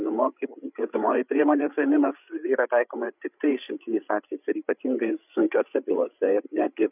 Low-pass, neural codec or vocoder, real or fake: 3.6 kHz; codec, 16 kHz, 8 kbps, FreqCodec, larger model; fake